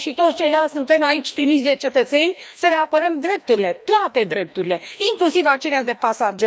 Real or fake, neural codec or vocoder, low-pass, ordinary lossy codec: fake; codec, 16 kHz, 1 kbps, FreqCodec, larger model; none; none